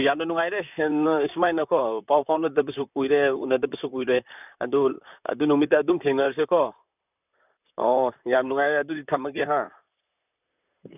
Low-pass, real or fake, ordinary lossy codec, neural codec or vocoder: 3.6 kHz; fake; none; vocoder, 44.1 kHz, 128 mel bands, Pupu-Vocoder